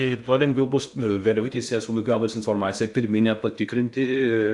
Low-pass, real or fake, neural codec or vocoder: 10.8 kHz; fake; codec, 16 kHz in and 24 kHz out, 0.6 kbps, FocalCodec, streaming, 2048 codes